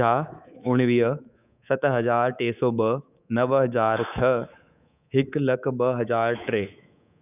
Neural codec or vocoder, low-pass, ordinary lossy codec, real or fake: codec, 24 kHz, 3.1 kbps, DualCodec; 3.6 kHz; none; fake